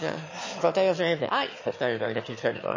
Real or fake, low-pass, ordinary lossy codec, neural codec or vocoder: fake; 7.2 kHz; MP3, 32 kbps; autoencoder, 22.05 kHz, a latent of 192 numbers a frame, VITS, trained on one speaker